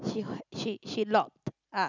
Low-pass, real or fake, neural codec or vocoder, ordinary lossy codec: 7.2 kHz; real; none; none